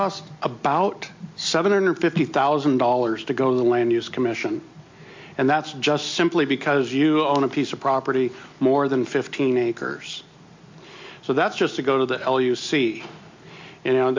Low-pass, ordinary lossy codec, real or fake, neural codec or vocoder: 7.2 kHz; MP3, 48 kbps; real; none